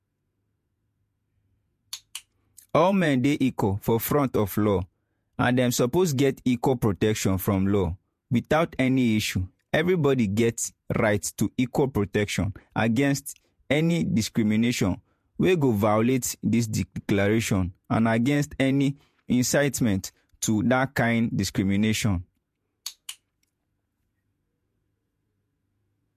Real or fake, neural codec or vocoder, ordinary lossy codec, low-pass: fake; vocoder, 48 kHz, 128 mel bands, Vocos; MP3, 64 kbps; 14.4 kHz